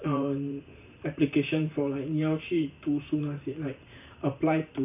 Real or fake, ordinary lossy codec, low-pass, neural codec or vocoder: fake; none; 3.6 kHz; vocoder, 44.1 kHz, 128 mel bands every 512 samples, BigVGAN v2